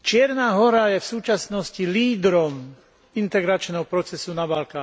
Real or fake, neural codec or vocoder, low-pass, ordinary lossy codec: real; none; none; none